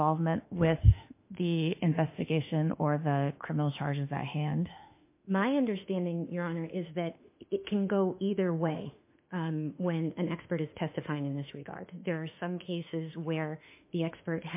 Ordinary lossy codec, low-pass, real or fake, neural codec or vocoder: MP3, 24 kbps; 3.6 kHz; fake; autoencoder, 48 kHz, 32 numbers a frame, DAC-VAE, trained on Japanese speech